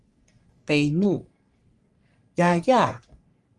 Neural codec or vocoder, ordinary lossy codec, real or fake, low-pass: codec, 44.1 kHz, 3.4 kbps, Pupu-Codec; Opus, 32 kbps; fake; 10.8 kHz